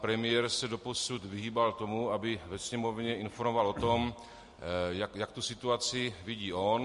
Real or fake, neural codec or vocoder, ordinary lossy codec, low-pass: real; none; MP3, 48 kbps; 14.4 kHz